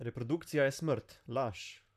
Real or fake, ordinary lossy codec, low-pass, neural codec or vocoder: fake; MP3, 96 kbps; 14.4 kHz; vocoder, 44.1 kHz, 128 mel bands, Pupu-Vocoder